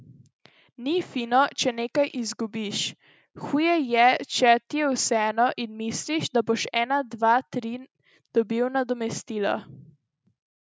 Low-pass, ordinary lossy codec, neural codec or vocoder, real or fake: none; none; none; real